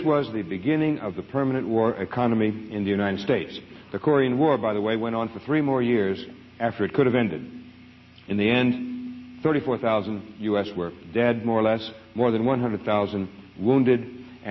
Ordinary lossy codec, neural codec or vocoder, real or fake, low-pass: MP3, 24 kbps; none; real; 7.2 kHz